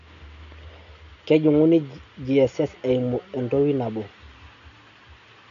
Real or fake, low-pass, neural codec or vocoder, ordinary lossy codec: real; 7.2 kHz; none; none